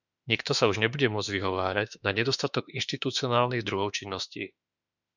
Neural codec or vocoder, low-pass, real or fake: autoencoder, 48 kHz, 32 numbers a frame, DAC-VAE, trained on Japanese speech; 7.2 kHz; fake